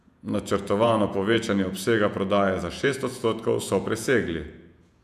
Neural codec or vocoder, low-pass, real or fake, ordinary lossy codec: vocoder, 48 kHz, 128 mel bands, Vocos; 14.4 kHz; fake; none